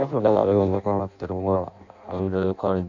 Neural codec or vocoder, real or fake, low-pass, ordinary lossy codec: codec, 16 kHz in and 24 kHz out, 0.6 kbps, FireRedTTS-2 codec; fake; 7.2 kHz; none